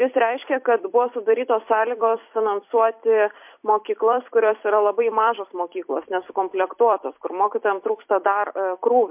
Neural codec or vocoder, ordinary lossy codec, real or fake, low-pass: vocoder, 44.1 kHz, 128 mel bands every 256 samples, BigVGAN v2; MP3, 32 kbps; fake; 3.6 kHz